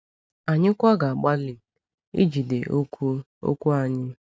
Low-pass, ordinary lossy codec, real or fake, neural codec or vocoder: none; none; real; none